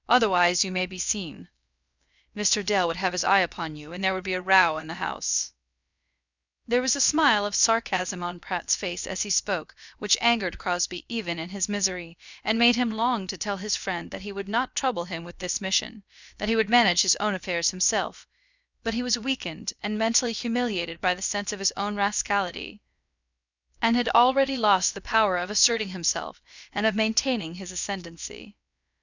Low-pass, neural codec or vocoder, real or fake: 7.2 kHz; codec, 16 kHz, about 1 kbps, DyCAST, with the encoder's durations; fake